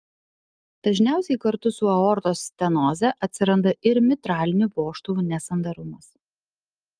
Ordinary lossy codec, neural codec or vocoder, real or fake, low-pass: Opus, 32 kbps; none; real; 9.9 kHz